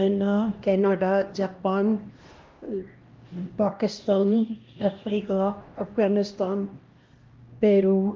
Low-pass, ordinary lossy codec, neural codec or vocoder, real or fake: 7.2 kHz; Opus, 32 kbps; codec, 16 kHz, 1 kbps, X-Codec, HuBERT features, trained on LibriSpeech; fake